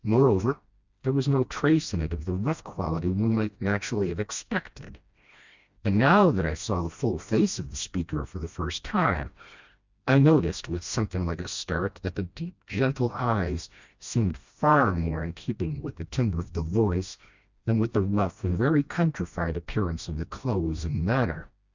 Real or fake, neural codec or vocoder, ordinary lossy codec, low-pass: fake; codec, 16 kHz, 1 kbps, FreqCodec, smaller model; Opus, 64 kbps; 7.2 kHz